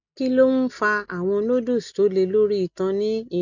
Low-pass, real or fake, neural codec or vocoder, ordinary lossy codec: 7.2 kHz; real; none; none